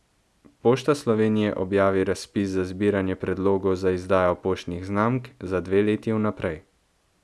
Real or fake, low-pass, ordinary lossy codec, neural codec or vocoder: real; none; none; none